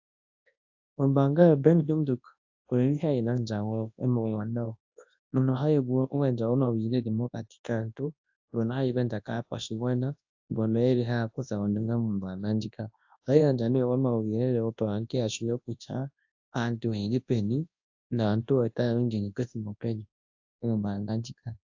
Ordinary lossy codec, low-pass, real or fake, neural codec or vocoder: AAC, 48 kbps; 7.2 kHz; fake; codec, 24 kHz, 0.9 kbps, WavTokenizer, large speech release